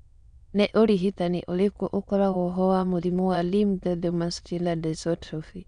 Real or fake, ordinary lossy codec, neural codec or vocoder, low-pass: fake; none; autoencoder, 22.05 kHz, a latent of 192 numbers a frame, VITS, trained on many speakers; 9.9 kHz